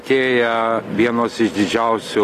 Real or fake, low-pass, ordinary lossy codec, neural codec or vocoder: real; 14.4 kHz; AAC, 48 kbps; none